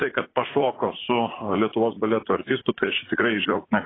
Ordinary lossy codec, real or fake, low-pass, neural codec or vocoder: AAC, 16 kbps; fake; 7.2 kHz; vocoder, 44.1 kHz, 80 mel bands, Vocos